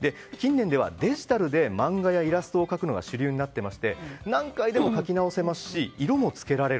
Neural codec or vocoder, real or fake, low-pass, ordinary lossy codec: none; real; none; none